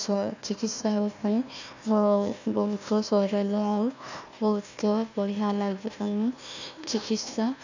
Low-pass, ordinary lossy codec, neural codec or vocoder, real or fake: 7.2 kHz; none; codec, 16 kHz, 1 kbps, FunCodec, trained on Chinese and English, 50 frames a second; fake